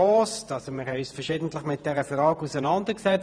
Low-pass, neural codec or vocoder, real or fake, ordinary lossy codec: 9.9 kHz; vocoder, 48 kHz, 128 mel bands, Vocos; fake; none